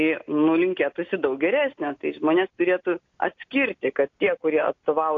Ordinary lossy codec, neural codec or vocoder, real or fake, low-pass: AAC, 48 kbps; none; real; 7.2 kHz